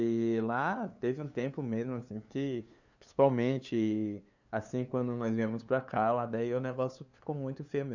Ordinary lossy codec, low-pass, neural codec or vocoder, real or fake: none; 7.2 kHz; codec, 16 kHz, 2 kbps, FunCodec, trained on LibriTTS, 25 frames a second; fake